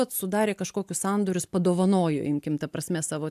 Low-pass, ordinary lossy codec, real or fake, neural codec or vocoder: 14.4 kHz; AAC, 96 kbps; real; none